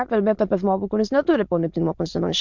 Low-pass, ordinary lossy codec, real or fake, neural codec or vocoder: 7.2 kHz; MP3, 64 kbps; fake; autoencoder, 22.05 kHz, a latent of 192 numbers a frame, VITS, trained on many speakers